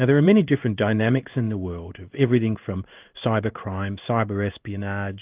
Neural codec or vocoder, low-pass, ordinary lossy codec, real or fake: codec, 16 kHz in and 24 kHz out, 1 kbps, XY-Tokenizer; 3.6 kHz; Opus, 32 kbps; fake